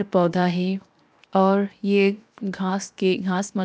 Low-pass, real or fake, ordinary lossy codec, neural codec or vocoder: none; fake; none; codec, 16 kHz, 0.7 kbps, FocalCodec